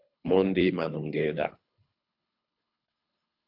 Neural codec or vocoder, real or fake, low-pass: codec, 24 kHz, 3 kbps, HILCodec; fake; 5.4 kHz